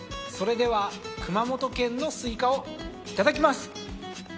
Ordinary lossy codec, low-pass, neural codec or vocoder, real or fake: none; none; none; real